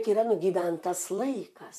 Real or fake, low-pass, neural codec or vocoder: fake; 14.4 kHz; vocoder, 44.1 kHz, 128 mel bands, Pupu-Vocoder